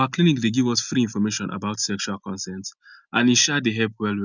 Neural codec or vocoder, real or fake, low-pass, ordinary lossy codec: none; real; 7.2 kHz; none